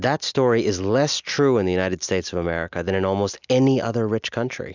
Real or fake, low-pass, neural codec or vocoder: real; 7.2 kHz; none